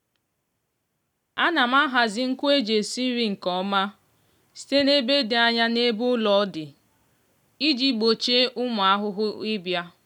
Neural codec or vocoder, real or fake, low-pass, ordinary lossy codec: none; real; 19.8 kHz; none